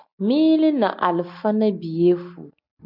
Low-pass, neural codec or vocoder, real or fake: 5.4 kHz; none; real